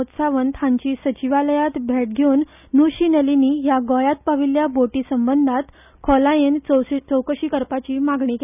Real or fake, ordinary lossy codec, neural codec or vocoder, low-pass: real; none; none; 3.6 kHz